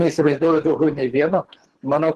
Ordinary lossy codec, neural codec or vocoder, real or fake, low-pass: Opus, 16 kbps; codec, 24 kHz, 3 kbps, HILCodec; fake; 10.8 kHz